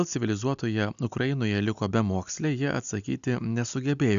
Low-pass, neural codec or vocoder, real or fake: 7.2 kHz; none; real